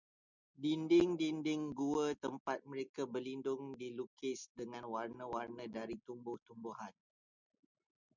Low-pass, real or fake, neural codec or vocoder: 7.2 kHz; real; none